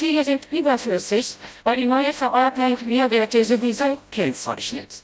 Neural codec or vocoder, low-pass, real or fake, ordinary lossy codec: codec, 16 kHz, 0.5 kbps, FreqCodec, smaller model; none; fake; none